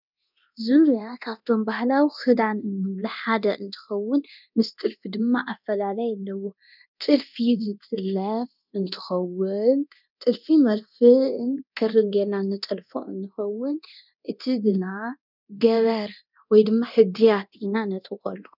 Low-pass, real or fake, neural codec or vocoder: 5.4 kHz; fake; codec, 24 kHz, 0.9 kbps, DualCodec